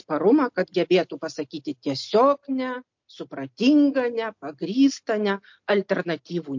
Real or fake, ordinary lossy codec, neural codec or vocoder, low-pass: real; MP3, 48 kbps; none; 7.2 kHz